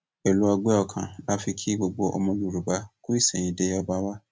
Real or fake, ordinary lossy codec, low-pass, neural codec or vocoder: real; none; none; none